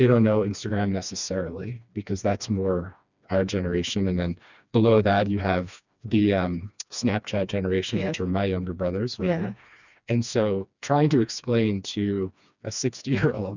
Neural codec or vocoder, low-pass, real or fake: codec, 16 kHz, 2 kbps, FreqCodec, smaller model; 7.2 kHz; fake